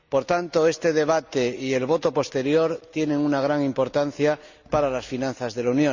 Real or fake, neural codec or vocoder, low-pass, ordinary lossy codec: real; none; 7.2 kHz; Opus, 64 kbps